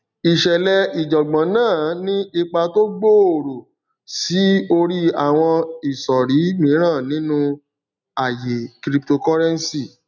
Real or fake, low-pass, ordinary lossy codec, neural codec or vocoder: real; 7.2 kHz; none; none